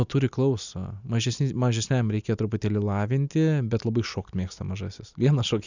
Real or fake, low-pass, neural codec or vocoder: real; 7.2 kHz; none